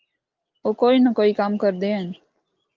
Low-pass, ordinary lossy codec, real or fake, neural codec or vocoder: 7.2 kHz; Opus, 32 kbps; real; none